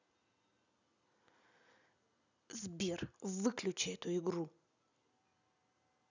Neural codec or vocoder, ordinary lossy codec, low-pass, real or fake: none; none; 7.2 kHz; real